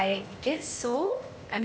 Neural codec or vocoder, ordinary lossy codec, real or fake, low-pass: codec, 16 kHz, 0.8 kbps, ZipCodec; none; fake; none